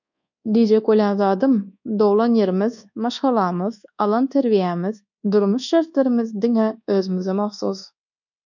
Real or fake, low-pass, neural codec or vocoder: fake; 7.2 kHz; codec, 24 kHz, 1.2 kbps, DualCodec